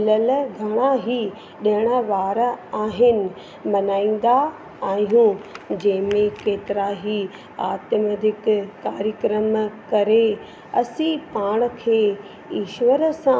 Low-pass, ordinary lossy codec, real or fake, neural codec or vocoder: none; none; real; none